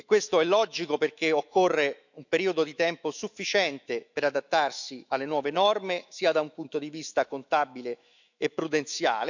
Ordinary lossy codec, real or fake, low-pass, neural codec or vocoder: none; fake; 7.2 kHz; autoencoder, 48 kHz, 128 numbers a frame, DAC-VAE, trained on Japanese speech